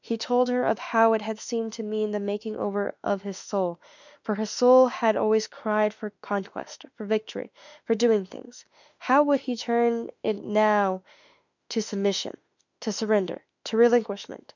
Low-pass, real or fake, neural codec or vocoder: 7.2 kHz; fake; autoencoder, 48 kHz, 32 numbers a frame, DAC-VAE, trained on Japanese speech